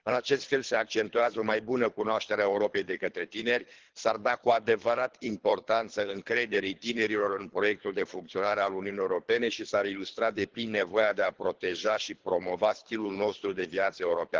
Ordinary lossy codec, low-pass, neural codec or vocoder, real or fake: Opus, 16 kbps; 7.2 kHz; codec, 24 kHz, 3 kbps, HILCodec; fake